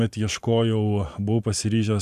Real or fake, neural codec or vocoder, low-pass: fake; vocoder, 44.1 kHz, 128 mel bands every 512 samples, BigVGAN v2; 14.4 kHz